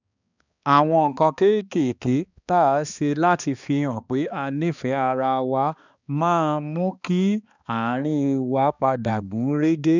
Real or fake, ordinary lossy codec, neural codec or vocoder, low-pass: fake; none; codec, 16 kHz, 2 kbps, X-Codec, HuBERT features, trained on balanced general audio; 7.2 kHz